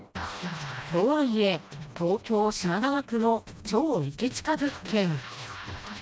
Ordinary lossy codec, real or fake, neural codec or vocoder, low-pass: none; fake; codec, 16 kHz, 1 kbps, FreqCodec, smaller model; none